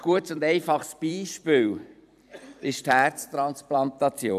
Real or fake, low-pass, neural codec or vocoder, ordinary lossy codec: fake; 14.4 kHz; vocoder, 44.1 kHz, 128 mel bands every 256 samples, BigVGAN v2; none